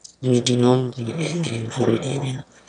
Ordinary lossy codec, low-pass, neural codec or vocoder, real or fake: MP3, 96 kbps; 9.9 kHz; autoencoder, 22.05 kHz, a latent of 192 numbers a frame, VITS, trained on one speaker; fake